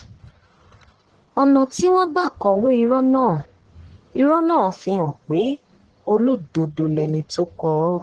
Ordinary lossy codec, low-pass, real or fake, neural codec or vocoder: Opus, 16 kbps; 10.8 kHz; fake; codec, 44.1 kHz, 1.7 kbps, Pupu-Codec